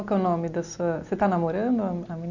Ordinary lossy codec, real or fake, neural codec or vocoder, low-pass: none; real; none; 7.2 kHz